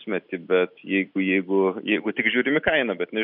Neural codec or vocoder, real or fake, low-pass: none; real; 5.4 kHz